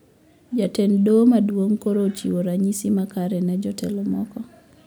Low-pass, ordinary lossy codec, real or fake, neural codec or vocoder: none; none; real; none